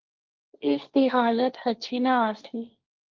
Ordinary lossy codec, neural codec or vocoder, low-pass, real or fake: Opus, 24 kbps; codec, 16 kHz, 1.1 kbps, Voila-Tokenizer; 7.2 kHz; fake